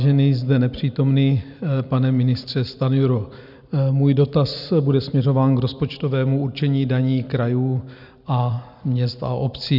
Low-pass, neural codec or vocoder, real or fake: 5.4 kHz; none; real